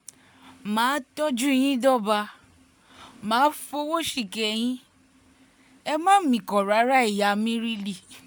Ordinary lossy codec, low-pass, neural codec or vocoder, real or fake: none; none; none; real